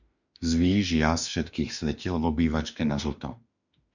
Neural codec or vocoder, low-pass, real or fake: autoencoder, 48 kHz, 32 numbers a frame, DAC-VAE, trained on Japanese speech; 7.2 kHz; fake